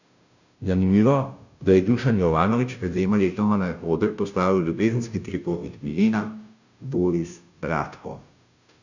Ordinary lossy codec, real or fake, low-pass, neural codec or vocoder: none; fake; 7.2 kHz; codec, 16 kHz, 0.5 kbps, FunCodec, trained on Chinese and English, 25 frames a second